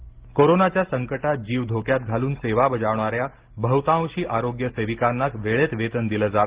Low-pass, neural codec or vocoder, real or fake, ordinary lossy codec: 3.6 kHz; none; real; Opus, 16 kbps